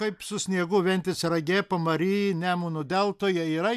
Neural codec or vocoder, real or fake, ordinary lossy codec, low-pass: none; real; AAC, 96 kbps; 14.4 kHz